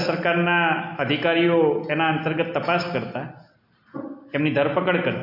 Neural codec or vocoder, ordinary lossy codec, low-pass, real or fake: none; MP3, 48 kbps; 5.4 kHz; real